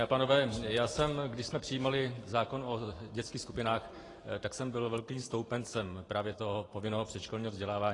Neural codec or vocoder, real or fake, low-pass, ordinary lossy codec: vocoder, 44.1 kHz, 128 mel bands every 512 samples, BigVGAN v2; fake; 10.8 kHz; AAC, 32 kbps